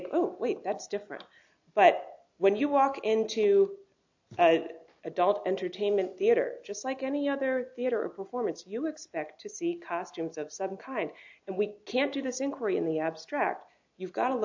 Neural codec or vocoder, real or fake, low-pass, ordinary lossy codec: none; real; 7.2 kHz; AAC, 48 kbps